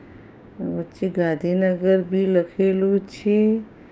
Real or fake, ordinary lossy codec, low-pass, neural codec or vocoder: fake; none; none; codec, 16 kHz, 6 kbps, DAC